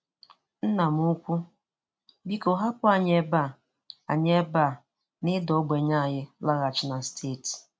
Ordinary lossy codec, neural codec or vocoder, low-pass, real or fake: none; none; none; real